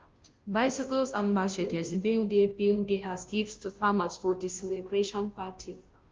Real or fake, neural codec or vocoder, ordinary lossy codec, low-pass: fake; codec, 16 kHz, 0.5 kbps, FunCodec, trained on Chinese and English, 25 frames a second; Opus, 24 kbps; 7.2 kHz